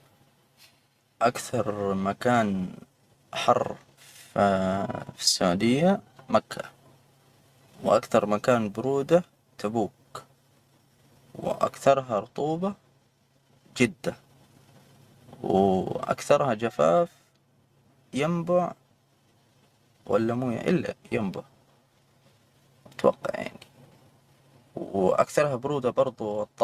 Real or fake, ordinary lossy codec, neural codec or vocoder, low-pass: real; Opus, 64 kbps; none; 14.4 kHz